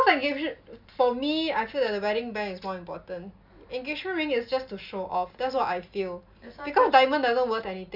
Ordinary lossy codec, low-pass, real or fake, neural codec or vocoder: none; 5.4 kHz; real; none